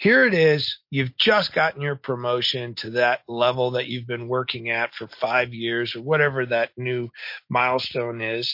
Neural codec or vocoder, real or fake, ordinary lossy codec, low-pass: none; real; MP3, 32 kbps; 5.4 kHz